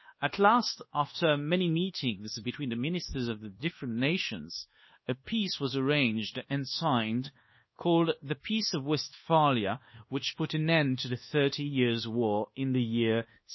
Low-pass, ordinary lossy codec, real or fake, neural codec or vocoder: 7.2 kHz; MP3, 24 kbps; fake; codec, 24 kHz, 1.2 kbps, DualCodec